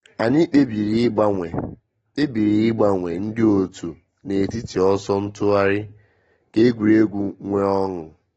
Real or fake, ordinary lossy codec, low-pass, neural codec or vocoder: real; AAC, 24 kbps; 10.8 kHz; none